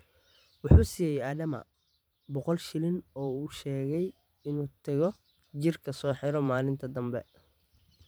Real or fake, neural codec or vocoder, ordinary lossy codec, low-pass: fake; vocoder, 44.1 kHz, 128 mel bands every 512 samples, BigVGAN v2; none; none